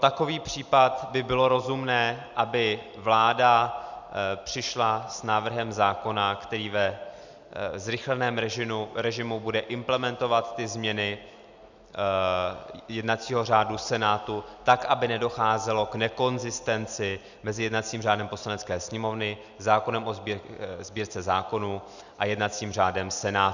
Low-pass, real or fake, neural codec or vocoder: 7.2 kHz; real; none